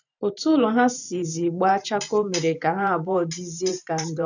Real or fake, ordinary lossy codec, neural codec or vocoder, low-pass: fake; none; vocoder, 44.1 kHz, 128 mel bands every 512 samples, BigVGAN v2; 7.2 kHz